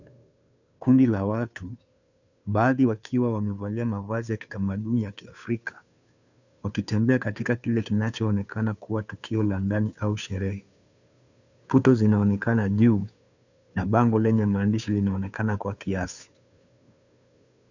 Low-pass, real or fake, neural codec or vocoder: 7.2 kHz; fake; codec, 16 kHz, 2 kbps, FunCodec, trained on Chinese and English, 25 frames a second